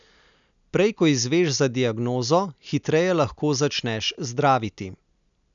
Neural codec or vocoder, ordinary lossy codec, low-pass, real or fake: none; none; 7.2 kHz; real